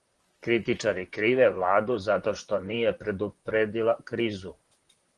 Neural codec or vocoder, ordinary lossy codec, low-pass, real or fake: vocoder, 44.1 kHz, 128 mel bands, Pupu-Vocoder; Opus, 24 kbps; 10.8 kHz; fake